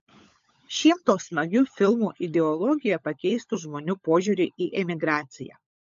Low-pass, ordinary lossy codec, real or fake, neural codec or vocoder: 7.2 kHz; MP3, 48 kbps; fake; codec, 16 kHz, 16 kbps, FunCodec, trained on LibriTTS, 50 frames a second